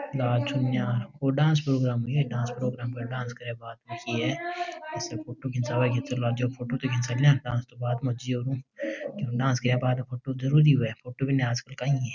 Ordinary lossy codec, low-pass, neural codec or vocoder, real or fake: none; 7.2 kHz; none; real